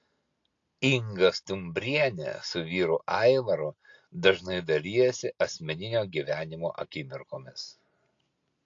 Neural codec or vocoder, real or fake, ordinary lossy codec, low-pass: none; real; AAC, 48 kbps; 7.2 kHz